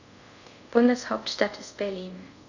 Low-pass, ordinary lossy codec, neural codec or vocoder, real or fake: 7.2 kHz; none; codec, 24 kHz, 0.5 kbps, DualCodec; fake